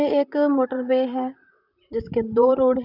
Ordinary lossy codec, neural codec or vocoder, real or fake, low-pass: none; vocoder, 44.1 kHz, 128 mel bands, Pupu-Vocoder; fake; 5.4 kHz